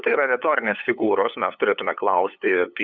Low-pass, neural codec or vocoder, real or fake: 7.2 kHz; codec, 16 kHz, 8 kbps, FunCodec, trained on LibriTTS, 25 frames a second; fake